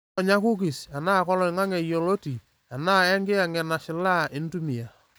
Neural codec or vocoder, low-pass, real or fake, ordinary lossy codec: vocoder, 44.1 kHz, 128 mel bands every 256 samples, BigVGAN v2; none; fake; none